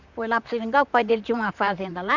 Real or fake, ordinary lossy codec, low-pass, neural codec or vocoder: fake; none; 7.2 kHz; vocoder, 44.1 kHz, 128 mel bands, Pupu-Vocoder